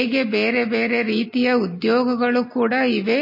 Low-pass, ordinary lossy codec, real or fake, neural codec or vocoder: 5.4 kHz; MP3, 24 kbps; real; none